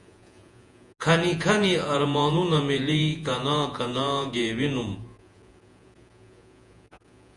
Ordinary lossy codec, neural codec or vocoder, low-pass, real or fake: Opus, 64 kbps; vocoder, 48 kHz, 128 mel bands, Vocos; 10.8 kHz; fake